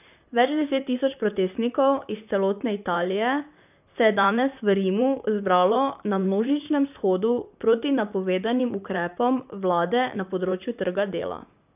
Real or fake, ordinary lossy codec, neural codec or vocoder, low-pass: fake; none; vocoder, 44.1 kHz, 128 mel bands, Pupu-Vocoder; 3.6 kHz